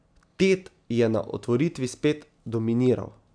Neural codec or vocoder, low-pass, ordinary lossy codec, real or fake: none; 9.9 kHz; none; real